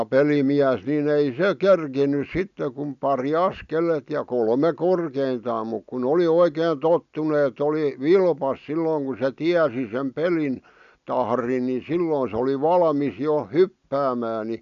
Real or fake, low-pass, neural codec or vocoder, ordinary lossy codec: real; 7.2 kHz; none; none